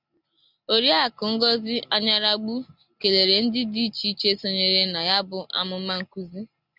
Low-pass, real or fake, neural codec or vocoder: 5.4 kHz; real; none